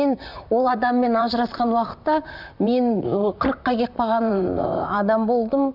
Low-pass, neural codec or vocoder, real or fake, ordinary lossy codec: 5.4 kHz; vocoder, 44.1 kHz, 128 mel bands, Pupu-Vocoder; fake; none